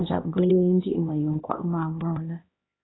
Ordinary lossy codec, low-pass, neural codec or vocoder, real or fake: AAC, 16 kbps; 7.2 kHz; codec, 16 kHz, 1 kbps, X-Codec, HuBERT features, trained on LibriSpeech; fake